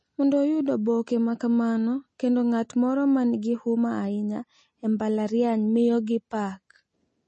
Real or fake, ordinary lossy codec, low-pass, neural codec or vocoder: real; MP3, 32 kbps; 9.9 kHz; none